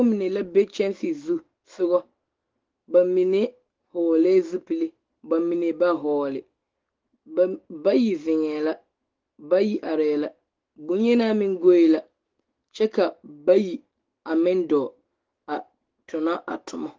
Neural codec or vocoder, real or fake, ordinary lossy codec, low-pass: autoencoder, 48 kHz, 128 numbers a frame, DAC-VAE, trained on Japanese speech; fake; Opus, 16 kbps; 7.2 kHz